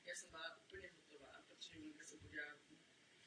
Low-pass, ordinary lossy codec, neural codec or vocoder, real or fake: 9.9 kHz; AAC, 32 kbps; none; real